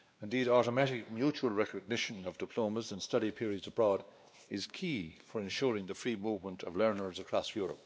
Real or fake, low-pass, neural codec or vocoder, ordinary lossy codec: fake; none; codec, 16 kHz, 2 kbps, X-Codec, WavLM features, trained on Multilingual LibriSpeech; none